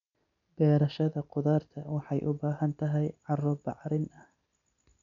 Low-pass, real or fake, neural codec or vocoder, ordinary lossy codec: 7.2 kHz; real; none; none